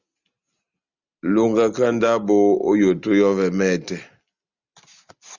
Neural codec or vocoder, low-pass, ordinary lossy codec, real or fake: none; 7.2 kHz; Opus, 64 kbps; real